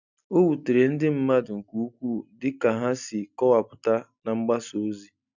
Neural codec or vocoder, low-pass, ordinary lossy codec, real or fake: none; 7.2 kHz; none; real